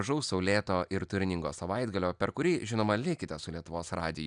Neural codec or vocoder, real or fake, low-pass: none; real; 9.9 kHz